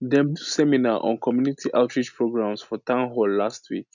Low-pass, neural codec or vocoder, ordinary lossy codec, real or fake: 7.2 kHz; none; none; real